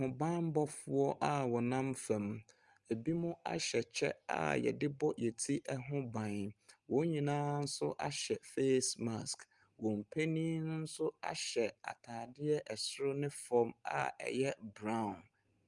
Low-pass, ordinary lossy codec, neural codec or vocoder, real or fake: 10.8 kHz; Opus, 32 kbps; none; real